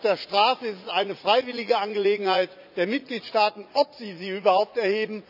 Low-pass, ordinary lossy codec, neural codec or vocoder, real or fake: 5.4 kHz; none; vocoder, 44.1 kHz, 80 mel bands, Vocos; fake